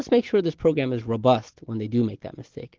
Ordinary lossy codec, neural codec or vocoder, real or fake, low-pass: Opus, 16 kbps; none; real; 7.2 kHz